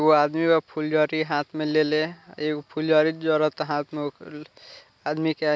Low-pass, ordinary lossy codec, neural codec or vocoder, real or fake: none; none; none; real